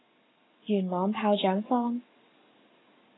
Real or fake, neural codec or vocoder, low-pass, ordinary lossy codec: fake; codec, 44.1 kHz, 7.8 kbps, Pupu-Codec; 7.2 kHz; AAC, 16 kbps